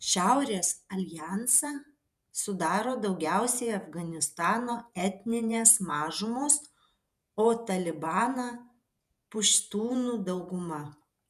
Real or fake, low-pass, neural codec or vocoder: fake; 14.4 kHz; vocoder, 48 kHz, 128 mel bands, Vocos